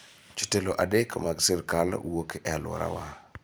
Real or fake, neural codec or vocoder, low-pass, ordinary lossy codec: fake; vocoder, 44.1 kHz, 128 mel bands every 256 samples, BigVGAN v2; none; none